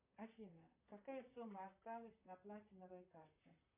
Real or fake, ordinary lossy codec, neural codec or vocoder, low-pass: fake; MP3, 24 kbps; vocoder, 44.1 kHz, 128 mel bands, Pupu-Vocoder; 3.6 kHz